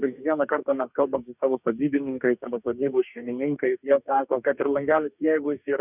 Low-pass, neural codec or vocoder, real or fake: 3.6 kHz; codec, 44.1 kHz, 2.6 kbps, DAC; fake